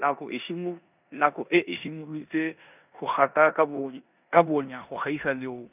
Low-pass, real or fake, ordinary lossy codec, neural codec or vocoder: 3.6 kHz; fake; none; codec, 16 kHz in and 24 kHz out, 0.9 kbps, LongCat-Audio-Codec, four codebook decoder